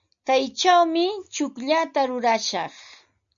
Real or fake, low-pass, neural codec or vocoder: real; 7.2 kHz; none